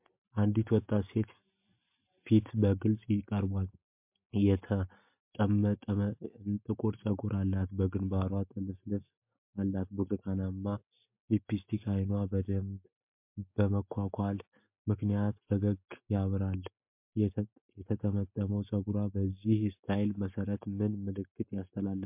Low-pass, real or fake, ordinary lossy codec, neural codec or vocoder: 3.6 kHz; real; MP3, 32 kbps; none